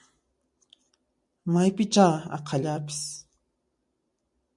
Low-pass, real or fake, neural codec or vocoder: 10.8 kHz; real; none